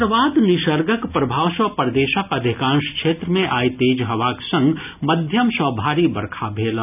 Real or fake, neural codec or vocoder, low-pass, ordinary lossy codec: real; none; 3.6 kHz; none